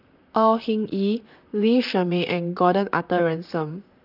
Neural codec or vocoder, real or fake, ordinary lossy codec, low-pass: vocoder, 44.1 kHz, 128 mel bands, Pupu-Vocoder; fake; none; 5.4 kHz